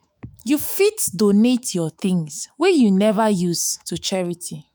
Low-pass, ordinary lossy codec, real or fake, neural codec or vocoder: none; none; fake; autoencoder, 48 kHz, 128 numbers a frame, DAC-VAE, trained on Japanese speech